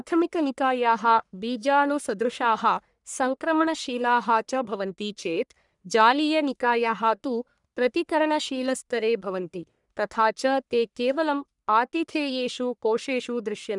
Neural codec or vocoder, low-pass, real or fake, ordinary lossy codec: codec, 44.1 kHz, 1.7 kbps, Pupu-Codec; 10.8 kHz; fake; none